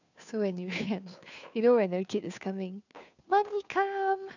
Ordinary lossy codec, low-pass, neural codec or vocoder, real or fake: none; 7.2 kHz; codec, 16 kHz, 2 kbps, FunCodec, trained on Chinese and English, 25 frames a second; fake